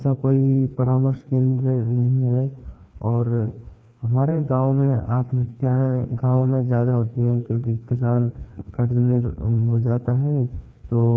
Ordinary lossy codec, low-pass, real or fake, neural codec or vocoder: none; none; fake; codec, 16 kHz, 2 kbps, FreqCodec, larger model